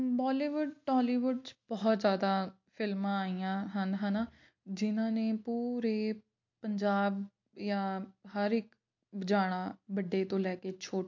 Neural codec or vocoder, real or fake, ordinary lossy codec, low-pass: none; real; MP3, 48 kbps; 7.2 kHz